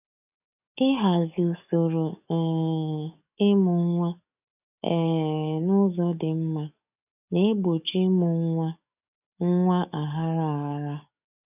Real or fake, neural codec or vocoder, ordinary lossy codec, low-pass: fake; codec, 44.1 kHz, 7.8 kbps, DAC; none; 3.6 kHz